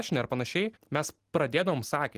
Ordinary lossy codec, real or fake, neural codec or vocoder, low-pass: Opus, 24 kbps; real; none; 14.4 kHz